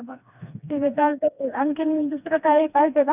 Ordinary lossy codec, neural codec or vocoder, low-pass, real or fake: none; codec, 16 kHz, 2 kbps, FreqCodec, smaller model; 3.6 kHz; fake